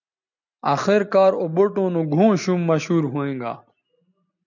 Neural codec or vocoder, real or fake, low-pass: none; real; 7.2 kHz